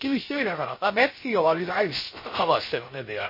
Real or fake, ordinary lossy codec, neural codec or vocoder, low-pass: fake; MP3, 24 kbps; codec, 16 kHz, 0.3 kbps, FocalCodec; 5.4 kHz